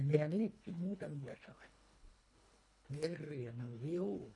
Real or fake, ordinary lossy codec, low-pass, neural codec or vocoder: fake; MP3, 96 kbps; 10.8 kHz; codec, 24 kHz, 1.5 kbps, HILCodec